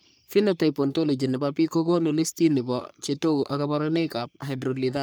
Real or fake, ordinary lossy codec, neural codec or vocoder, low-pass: fake; none; codec, 44.1 kHz, 3.4 kbps, Pupu-Codec; none